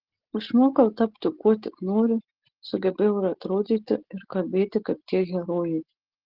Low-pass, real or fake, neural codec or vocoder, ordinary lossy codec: 5.4 kHz; real; none; Opus, 16 kbps